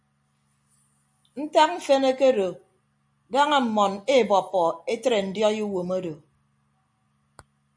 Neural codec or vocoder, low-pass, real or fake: none; 9.9 kHz; real